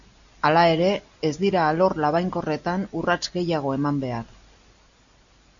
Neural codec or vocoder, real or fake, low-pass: none; real; 7.2 kHz